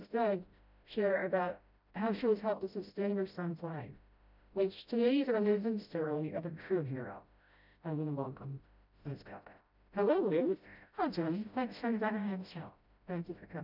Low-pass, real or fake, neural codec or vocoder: 5.4 kHz; fake; codec, 16 kHz, 0.5 kbps, FreqCodec, smaller model